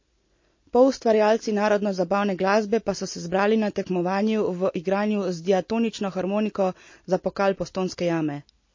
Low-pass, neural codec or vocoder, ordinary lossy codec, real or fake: 7.2 kHz; none; MP3, 32 kbps; real